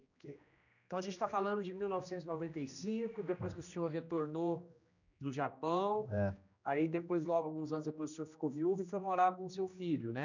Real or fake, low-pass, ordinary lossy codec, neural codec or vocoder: fake; 7.2 kHz; none; codec, 16 kHz, 1 kbps, X-Codec, HuBERT features, trained on general audio